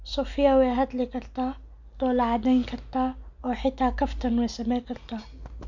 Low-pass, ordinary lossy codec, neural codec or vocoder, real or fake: 7.2 kHz; none; none; real